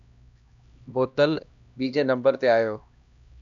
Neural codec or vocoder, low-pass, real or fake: codec, 16 kHz, 1 kbps, X-Codec, HuBERT features, trained on LibriSpeech; 7.2 kHz; fake